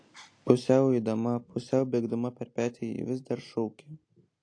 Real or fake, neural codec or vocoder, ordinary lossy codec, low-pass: real; none; AAC, 48 kbps; 9.9 kHz